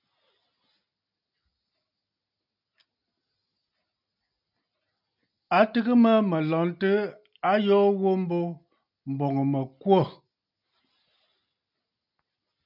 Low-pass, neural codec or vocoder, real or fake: 5.4 kHz; none; real